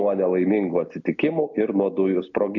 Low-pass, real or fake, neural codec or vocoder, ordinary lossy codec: 7.2 kHz; real; none; MP3, 64 kbps